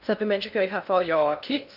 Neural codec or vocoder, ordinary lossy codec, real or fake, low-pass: codec, 16 kHz in and 24 kHz out, 0.6 kbps, FocalCodec, streaming, 2048 codes; none; fake; 5.4 kHz